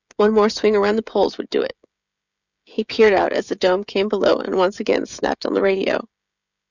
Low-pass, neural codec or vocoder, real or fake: 7.2 kHz; codec, 16 kHz, 16 kbps, FreqCodec, smaller model; fake